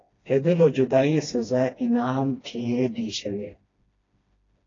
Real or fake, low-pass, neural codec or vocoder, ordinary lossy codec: fake; 7.2 kHz; codec, 16 kHz, 1 kbps, FreqCodec, smaller model; AAC, 32 kbps